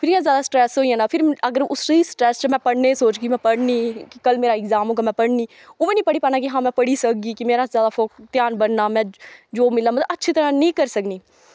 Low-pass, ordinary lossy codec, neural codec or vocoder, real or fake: none; none; none; real